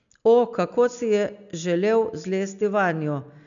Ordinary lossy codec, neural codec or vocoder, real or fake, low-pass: none; none; real; 7.2 kHz